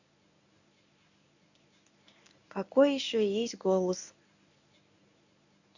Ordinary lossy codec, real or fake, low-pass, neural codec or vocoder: none; fake; 7.2 kHz; codec, 24 kHz, 0.9 kbps, WavTokenizer, medium speech release version 1